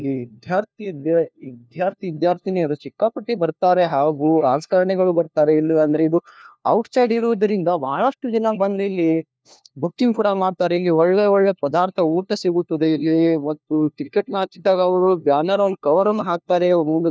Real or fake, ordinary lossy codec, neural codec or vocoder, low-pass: fake; none; codec, 16 kHz, 1 kbps, FunCodec, trained on LibriTTS, 50 frames a second; none